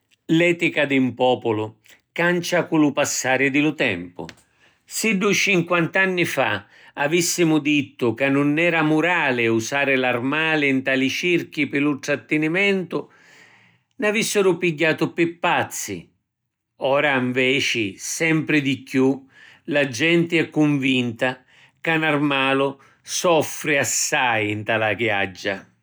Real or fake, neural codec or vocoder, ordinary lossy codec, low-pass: real; none; none; none